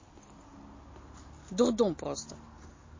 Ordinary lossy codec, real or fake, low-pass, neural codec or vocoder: MP3, 32 kbps; real; 7.2 kHz; none